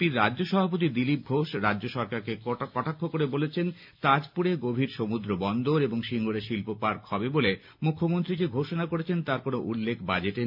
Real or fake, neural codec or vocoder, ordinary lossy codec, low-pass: real; none; MP3, 48 kbps; 5.4 kHz